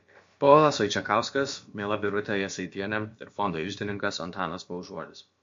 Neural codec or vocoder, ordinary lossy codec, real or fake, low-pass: codec, 16 kHz, about 1 kbps, DyCAST, with the encoder's durations; MP3, 48 kbps; fake; 7.2 kHz